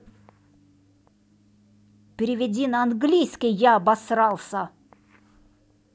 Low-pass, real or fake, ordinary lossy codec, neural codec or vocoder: none; real; none; none